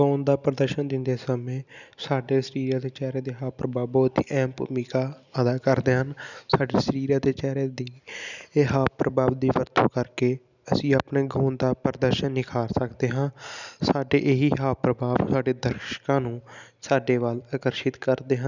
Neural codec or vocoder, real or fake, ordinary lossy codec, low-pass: none; real; none; 7.2 kHz